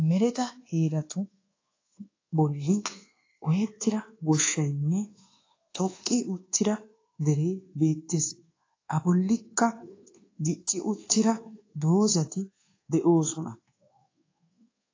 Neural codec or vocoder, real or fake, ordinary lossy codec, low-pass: codec, 24 kHz, 1.2 kbps, DualCodec; fake; AAC, 32 kbps; 7.2 kHz